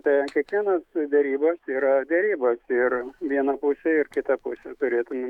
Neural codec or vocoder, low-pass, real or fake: autoencoder, 48 kHz, 128 numbers a frame, DAC-VAE, trained on Japanese speech; 19.8 kHz; fake